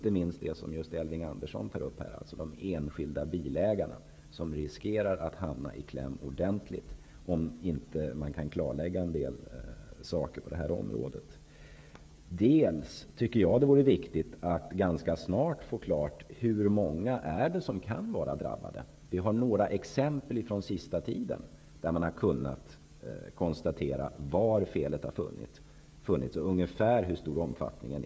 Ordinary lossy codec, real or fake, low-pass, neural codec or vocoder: none; fake; none; codec, 16 kHz, 16 kbps, FreqCodec, smaller model